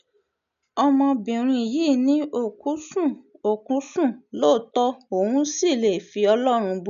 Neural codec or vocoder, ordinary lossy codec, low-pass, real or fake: none; none; 7.2 kHz; real